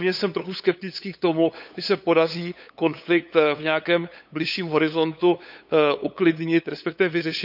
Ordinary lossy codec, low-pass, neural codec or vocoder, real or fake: none; 5.4 kHz; codec, 16 kHz, 8 kbps, FunCodec, trained on LibriTTS, 25 frames a second; fake